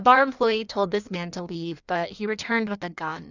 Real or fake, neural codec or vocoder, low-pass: fake; codec, 16 kHz in and 24 kHz out, 1.1 kbps, FireRedTTS-2 codec; 7.2 kHz